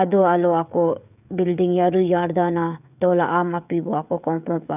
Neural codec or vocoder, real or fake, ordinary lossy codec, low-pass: codec, 16 kHz, 16 kbps, FreqCodec, smaller model; fake; none; 3.6 kHz